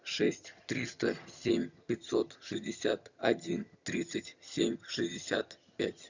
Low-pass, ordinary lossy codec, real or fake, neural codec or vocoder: 7.2 kHz; Opus, 64 kbps; fake; vocoder, 22.05 kHz, 80 mel bands, HiFi-GAN